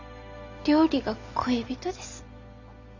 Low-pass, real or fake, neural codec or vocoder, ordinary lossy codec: 7.2 kHz; real; none; Opus, 64 kbps